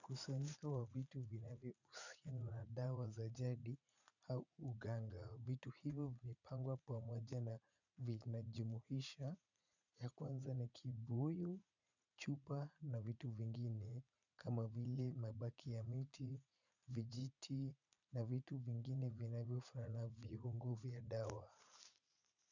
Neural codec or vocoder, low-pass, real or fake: vocoder, 44.1 kHz, 80 mel bands, Vocos; 7.2 kHz; fake